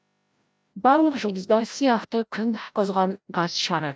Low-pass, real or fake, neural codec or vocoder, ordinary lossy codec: none; fake; codec, 16 kHz, 0.5 kbps, FreqCodec, larger model; none